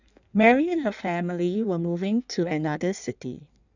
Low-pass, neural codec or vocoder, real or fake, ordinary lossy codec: 7.2 kHz; codec, 16 kHz in and 24 kHz out, 1.1 kbps, FireRedTTS-2 codec; fake; none